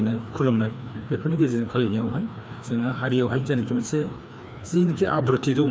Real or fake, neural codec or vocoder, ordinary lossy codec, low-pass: fake; codec, 16 kHz, 2 kbps, FreqCodec, larger model; none; none